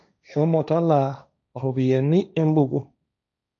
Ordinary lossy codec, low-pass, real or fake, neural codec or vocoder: none; 7.2 kHz; fake; codec, 16 kHz, 1.1 kbps, Voila-Tokenizer